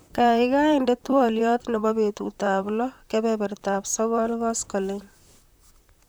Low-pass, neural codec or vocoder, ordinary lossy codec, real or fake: none; vocoder, 44.1 kHz, 128 mel bands, Pupu-Vocoder; none; fake